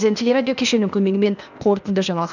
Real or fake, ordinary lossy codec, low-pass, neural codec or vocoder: fake; none; 7.2 kHz; codec, 16 kHz, 0.8 kbps, ZipCodec